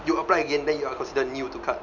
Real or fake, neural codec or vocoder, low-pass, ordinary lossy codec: real; none; 7.2 kHz; none